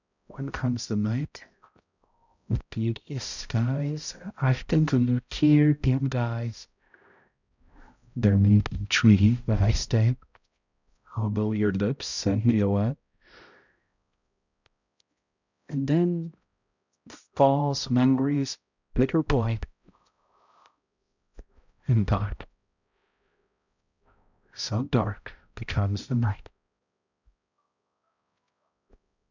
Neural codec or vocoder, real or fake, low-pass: codec, 16 kHz, 0.5 kbps, X-Codec, HuBERT features, trained on balanced general audio; fake; 7.2 kHz